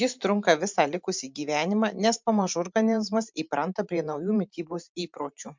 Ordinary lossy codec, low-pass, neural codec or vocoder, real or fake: MP3, 64 kbps; 7.2 kHz; vocoder, 44.1 kHz, 128 mel bands every 512 samples, BigVGAN v2; fake